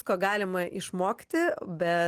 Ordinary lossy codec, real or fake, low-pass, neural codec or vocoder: Opus, 24 kbps; real; 14.4 kHz; none